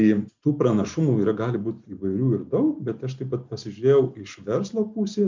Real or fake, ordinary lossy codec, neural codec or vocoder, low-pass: real; MP3, 64 kbps; none; 7.2 kHz